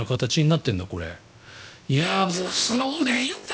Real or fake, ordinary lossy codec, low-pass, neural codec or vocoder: fake; none; none; codec, 16 kHz, about 1 kbps, DyCAST, with the encoder's durations